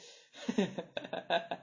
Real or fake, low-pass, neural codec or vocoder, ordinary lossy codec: real; 7.2 kHz; none; MP3, 32 kbps